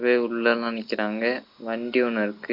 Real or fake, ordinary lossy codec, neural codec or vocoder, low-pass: real; MP3, 48 kbps; none; 5.4 kHz